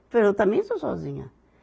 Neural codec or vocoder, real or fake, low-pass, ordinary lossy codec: none; real; none; none